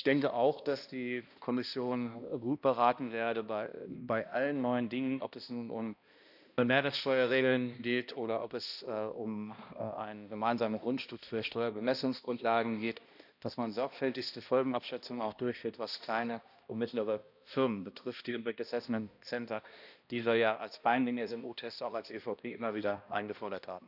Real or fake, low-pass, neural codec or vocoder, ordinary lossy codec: fake; 5.4 kHz; codec, 16 kHz, 1 kbps, X-Codec, HuBERT features, trained on balanced general audio; none